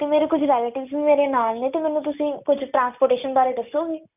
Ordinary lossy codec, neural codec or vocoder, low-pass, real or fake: none; none; 3.6 kHz; real